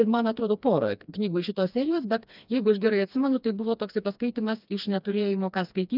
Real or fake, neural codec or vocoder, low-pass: fake; codec, 16 kHz, 2 kbps, FreqCodec, smaller model; 5.4 kHz